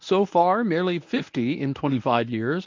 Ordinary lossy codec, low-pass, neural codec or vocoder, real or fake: MP3, 64 kbps; 7.2 kHz; codec, 24 kHz, 0.9 kbps, WavTokenizer, medium speech release version 1; fake